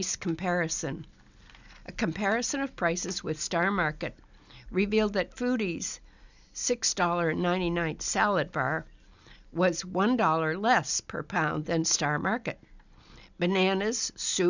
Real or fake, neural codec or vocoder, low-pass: real; none; 7.2 kHz